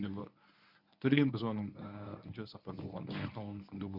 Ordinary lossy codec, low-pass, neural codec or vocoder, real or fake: none; 5.4 kHz; codec, 24 kHz, 0.9 kbps, WavTokenizer, medium speech release version 1; fake